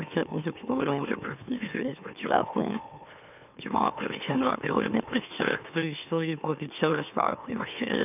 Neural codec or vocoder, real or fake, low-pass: autoencoder, 44.1 kHz, a latent of 192 numbers a frame, MeloTTS; fake; 3.6 kHz